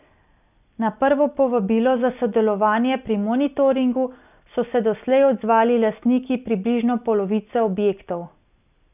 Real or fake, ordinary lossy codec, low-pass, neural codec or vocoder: real; none; 3.6 kHz; none